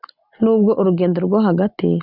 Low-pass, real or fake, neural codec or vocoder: 5.4 kHz; real; none